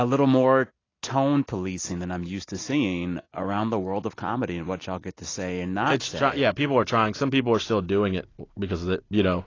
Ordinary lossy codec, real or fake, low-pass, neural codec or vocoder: AAC, 32 kbps; real; 7.2 kHz; none